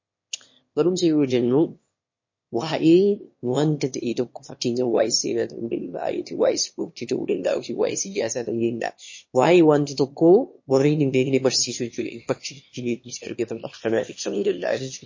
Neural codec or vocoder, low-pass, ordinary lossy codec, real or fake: autoencoder, 22.05 kHz, a latent of 192 numbers a frame, VITS, trained on one speaker; 7.2 kHz; MP3, 32 kbps; fake